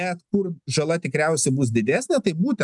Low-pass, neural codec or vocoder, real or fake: 10.8 kHz; none; real